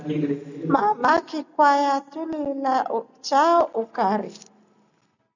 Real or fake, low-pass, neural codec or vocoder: real; 7.2 kHz; none